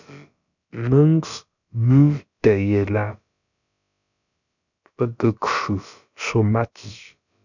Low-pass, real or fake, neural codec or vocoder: 7.2 kHz; fake; codec, 16 kHz, about 1 kbps, DyCAST, with the encoder's durations